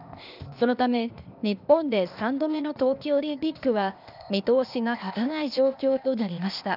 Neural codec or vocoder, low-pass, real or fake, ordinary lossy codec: codec, 16 kHz, 0.8 kbps, ZipCodec; 5.4 kHz; fake; none